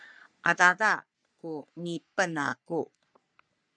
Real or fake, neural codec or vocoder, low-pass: fake; codec, 44.1 kHz, 3.4 kbps, Pupu-Codec; 9.9 kHz